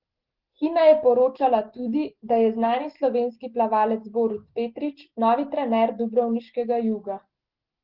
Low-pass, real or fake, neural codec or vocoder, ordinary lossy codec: 5.4 kHz; real; none; Opus, 16 kbps